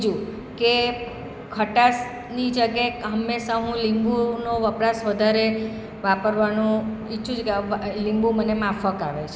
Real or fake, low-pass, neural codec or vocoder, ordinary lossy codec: real; none; none; none